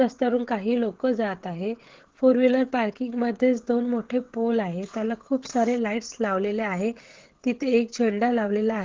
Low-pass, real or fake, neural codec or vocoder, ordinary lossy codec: 7.2 kHz; fake; codec, 16 kHz, 16 kbps, FreqCodec, smaller model; Opus, 16 kbps